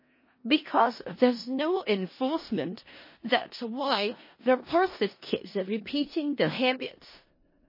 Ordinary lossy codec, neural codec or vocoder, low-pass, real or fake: MP3, 24 kbps; codec, 16 kHz in and 24 kHz out, 0.4 kbps, LongCat-Audio-Codec, four codebook decoder; 5.4 kHz; fake